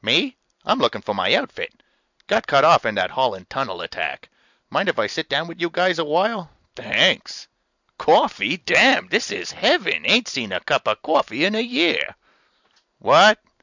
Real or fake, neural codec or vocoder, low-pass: real; none; 7.2 kHz